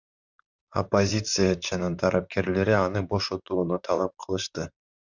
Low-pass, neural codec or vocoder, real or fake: 7.2 kHz; vocoder, 44.1 kHz, 128 mel bands, Pupu-Vocoder; fake